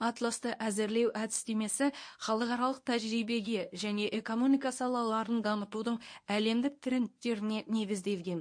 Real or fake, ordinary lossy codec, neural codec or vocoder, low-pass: fake; MP3, 48 kbps; codec, 24 kHz, 0.9 kbps, WavTokenizer, medium speech release version 1; 9.9 kHz